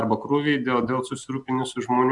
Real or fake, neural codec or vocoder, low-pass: real; none; 10.8 kHz